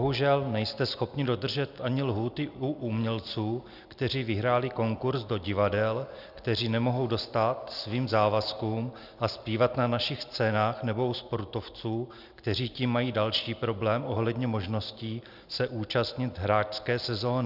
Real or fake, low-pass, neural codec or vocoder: real; 5.4 kHz; none